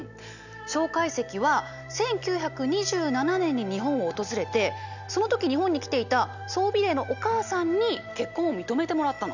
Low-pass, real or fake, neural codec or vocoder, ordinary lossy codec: 7.2 kHz; real; none; none